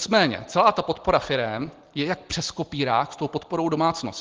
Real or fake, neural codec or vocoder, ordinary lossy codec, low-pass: real; none; Opus, 16 kbps; 7.2 kHz